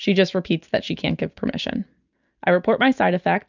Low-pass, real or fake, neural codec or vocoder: 7.2 kHz; real; none